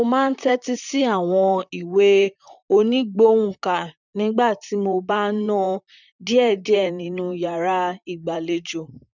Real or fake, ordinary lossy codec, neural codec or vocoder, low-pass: fake; none; vocoder, 44.1 kHz, 128 mel bands, Pupu-Vocoder; 7.2 kHz